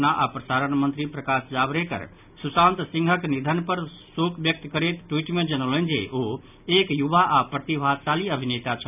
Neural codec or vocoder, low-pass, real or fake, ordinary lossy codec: none; 3.6 kHz; real; none